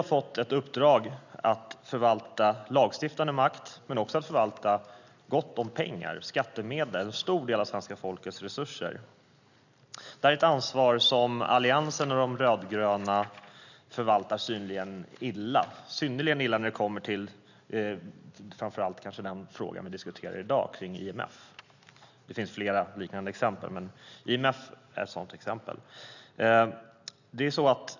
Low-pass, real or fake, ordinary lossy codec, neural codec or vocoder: 7.2 kHz; real; none; none